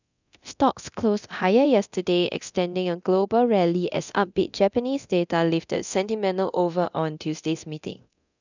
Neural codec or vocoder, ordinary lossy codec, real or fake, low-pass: codec, 24 kHz, 0.9 kbps, DualCodec; none; fake; 7.2 kHz